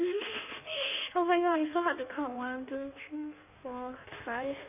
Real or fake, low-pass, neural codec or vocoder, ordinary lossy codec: fake; 3.6 kHz; codec, 16 kHz in and 24 kHz out, 1.1 kbps, FireRedTTS-2 codec; none